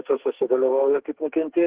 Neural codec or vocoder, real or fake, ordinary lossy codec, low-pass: codec, 44.1 kHz, 2.6 kbps, SNAC; fake; Opus, 16 kbps; 3.6 kHz